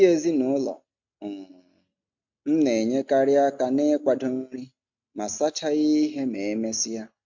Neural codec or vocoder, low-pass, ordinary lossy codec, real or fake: none; 7.2 kHz; MP3, 64 kbps; real